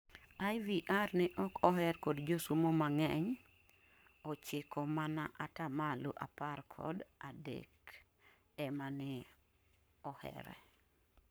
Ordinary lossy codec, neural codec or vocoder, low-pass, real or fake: none; codec, 44.1 kHz, 7.8 kbps, Pupu-Codec; none; fake